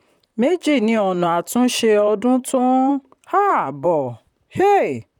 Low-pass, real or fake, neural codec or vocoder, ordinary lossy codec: 19.8 kHz; fake; vocoder, 44.1 kHz, 128 mel bands, Pupu-Vocoder; none